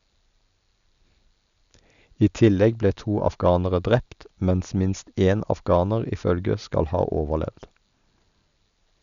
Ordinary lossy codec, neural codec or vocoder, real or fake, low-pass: none; none; real; 7.2 kHz